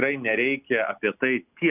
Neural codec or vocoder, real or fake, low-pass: none; real; 3.6 kHz